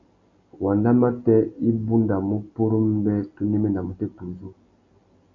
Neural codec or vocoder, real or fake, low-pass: none; real; 7.2 kHz